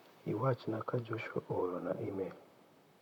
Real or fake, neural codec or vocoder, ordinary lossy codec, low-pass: fake; vocoder, 44.1 kHz, 128 mel bands, Pupu-Vocoder; none; 19.8 kHz